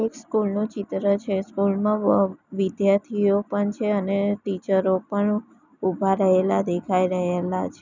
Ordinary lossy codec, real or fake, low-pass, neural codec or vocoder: none; real; 7.2 kHz; none